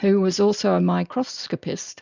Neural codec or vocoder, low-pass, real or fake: none; 7.2 kHz; real